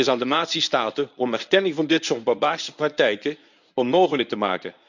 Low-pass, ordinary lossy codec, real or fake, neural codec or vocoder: 7.2 kHz; none; fake; codec, 24 kHz, 0.9 kbps, WavTokenizer, medium speech release version 1